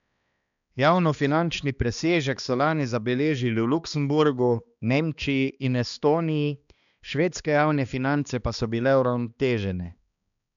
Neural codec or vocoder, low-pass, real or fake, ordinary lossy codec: codec, 16 kHz, 2 kbps, X-Codec, HuBERT features, trained on balanced general audio; 7.2 kHz; fake; none